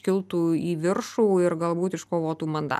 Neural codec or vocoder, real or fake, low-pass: none; real; 14.4 kHz